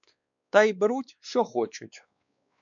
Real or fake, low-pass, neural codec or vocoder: fake; 7.2 kHz; codec, 16 kHz, 2 kbps, X-Codec, WavLM features, trained on Multilingual LibriSpeech